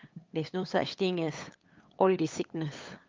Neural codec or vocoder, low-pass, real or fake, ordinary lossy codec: codec, 16 kHz, 8 kbps, FunCodec, trained on LibriTTS, 25 frames a second; 7.2 kHz; fake; Opus, 24 kbps